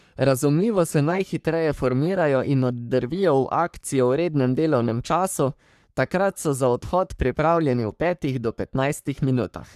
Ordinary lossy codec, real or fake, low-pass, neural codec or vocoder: none; fake; 14.4 kHz; codec, 44.1 kHz, 3.4 kbps, Pupu-Codec